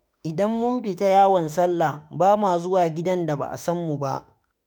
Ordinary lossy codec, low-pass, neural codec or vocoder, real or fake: none; none; autoencoder, 48 kHz, 32 numbers a frame, DAC-VAE, trained on Japanese speech; fake